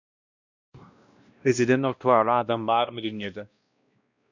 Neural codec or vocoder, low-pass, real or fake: codec, 16 kHz, 1 kbps, X-Codec, WavLM features, trained on Multilingual LibriSpeech; 7.2 kHz; fake